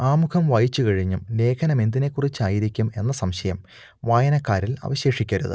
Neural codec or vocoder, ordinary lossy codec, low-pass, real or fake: none; none; none; real